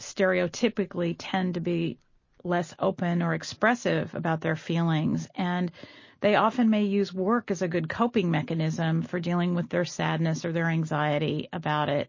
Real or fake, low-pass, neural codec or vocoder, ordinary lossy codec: real; 7.2 kHz; none; MP3, 32 kbps